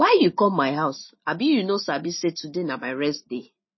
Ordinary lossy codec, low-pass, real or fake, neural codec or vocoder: MP3, 24 kbps; 7.2 kHz; real; none